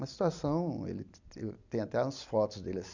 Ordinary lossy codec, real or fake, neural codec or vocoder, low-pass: none; real; none; 7.2 kHz